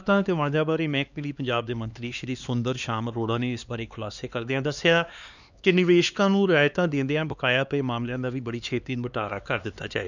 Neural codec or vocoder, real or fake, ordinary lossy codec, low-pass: codec, 16 kHz, 2 kbps, X-Codec, HuBERT features, trained on LibriSpeech; fake; none; 7.2 kHz